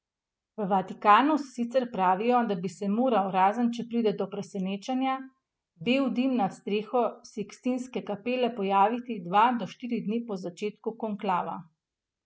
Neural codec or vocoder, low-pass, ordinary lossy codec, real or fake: none; none; none; real